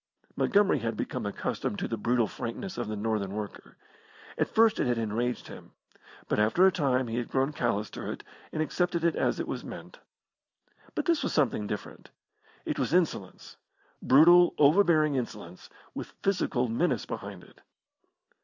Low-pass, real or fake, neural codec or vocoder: 7.2 kHz; real; none